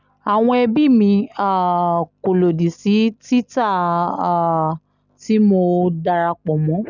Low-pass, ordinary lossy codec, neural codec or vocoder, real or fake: 7.2 kHz; none; none; real